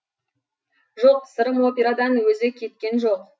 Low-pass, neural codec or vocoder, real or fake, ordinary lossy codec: none; none; real; none